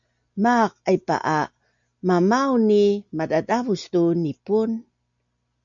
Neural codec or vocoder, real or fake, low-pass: none; real; 7.2 kHz